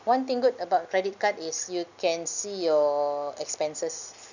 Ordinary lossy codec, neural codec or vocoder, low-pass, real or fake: none; none; 7.2 kHz; real